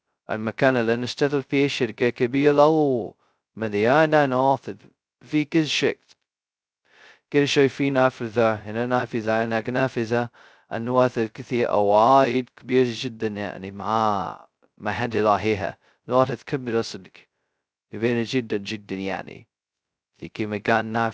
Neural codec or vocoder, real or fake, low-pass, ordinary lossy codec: codec, 16 kHz, 0.2 kbps, FocalCodec; fake; none; none